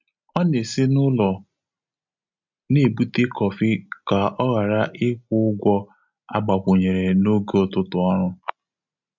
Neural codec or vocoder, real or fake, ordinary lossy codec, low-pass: none; real; MP3, 64 kbps; 7.2 kHz